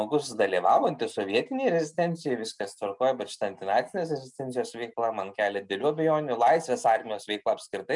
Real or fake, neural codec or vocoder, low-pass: real; none; 10.8 kHz